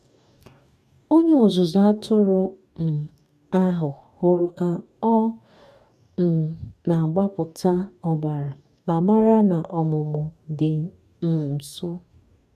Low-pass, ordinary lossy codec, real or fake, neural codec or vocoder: 14.4 kHz; none; fake; codec, 44.1 kHz, 2.6 kbps, DAC